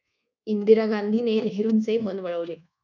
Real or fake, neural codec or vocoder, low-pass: fake; codec, 24 kHz, 1.2 kbps, DualCodec; 7.2 kHz